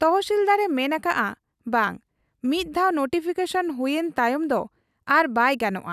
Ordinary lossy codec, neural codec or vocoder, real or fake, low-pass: none; none; real; 14.4 kHz